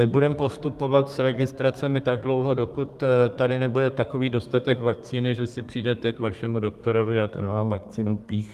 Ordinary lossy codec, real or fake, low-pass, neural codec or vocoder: Opus, 32 kbps; fake; 14.4 kHz; codec, 32 kHz, 1.9 kbps, SNAC